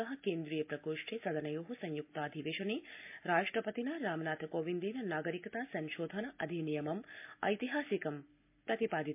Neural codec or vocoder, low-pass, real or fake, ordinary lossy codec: none; 3.6 kHz; real; MP3, 32 kbps